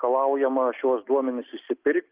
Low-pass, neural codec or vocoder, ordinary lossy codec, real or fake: 3.6 kHz; none; Opus, 32 kbps; real